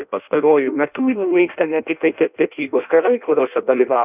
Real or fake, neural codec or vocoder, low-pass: fake; codec, 16 kHz in and 24 kHz out, 0.6 kbps, FireRedTTS-2 codec; 3.6 kHz